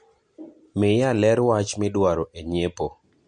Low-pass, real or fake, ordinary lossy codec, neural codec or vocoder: 9.9 kHz; real; MP3, 48 kbps; none